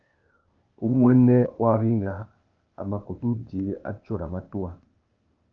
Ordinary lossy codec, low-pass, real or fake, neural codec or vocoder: Opus, 24 kbps; 7.2 kHz; fake; codec, 16 kHz, 0.8 kbps, ZipCodec